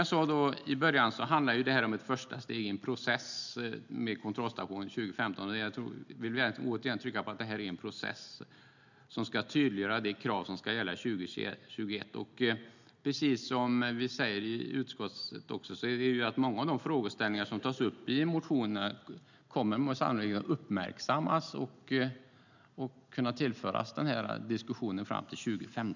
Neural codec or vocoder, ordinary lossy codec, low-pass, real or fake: none; none; 7.2 kHz; real